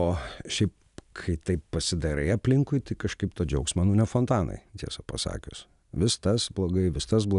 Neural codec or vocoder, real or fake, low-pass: none; real; 10.8 kHz